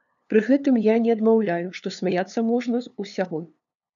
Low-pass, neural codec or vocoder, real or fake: 7.2 kHz; codec, 16 kHz, 2 kbps, FunCodec, trained on LibriTTS, 25 frames a second; fake